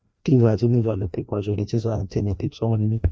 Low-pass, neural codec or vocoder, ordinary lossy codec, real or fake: none; codec, 16 kHz, 1 kbps, FreqCodec, larger model; none; fake